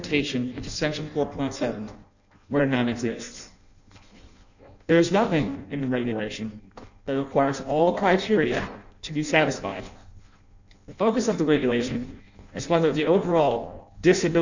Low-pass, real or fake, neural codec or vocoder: 7.2 kHz; fake; codec, 16 kHz in and 24 kHz out, 0.6 kbps, FireRedTTS-2 codec